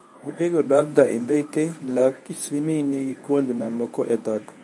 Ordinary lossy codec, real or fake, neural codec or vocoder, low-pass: none; fake; codec, 24 kHz, 0.9 kbps, WavTokenizer, medium speech release version 1; none